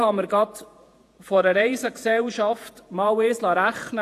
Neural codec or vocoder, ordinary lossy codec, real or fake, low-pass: vocoder, 48 kHz, 128 mel bands, Vocos; AAC, 64 kbps; fake; 14.4 kHz